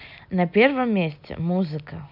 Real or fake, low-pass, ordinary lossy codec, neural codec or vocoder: real; 5.4 kHz; none; none